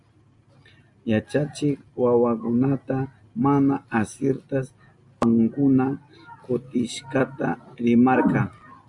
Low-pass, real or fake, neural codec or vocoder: 10.8 kHz; real; none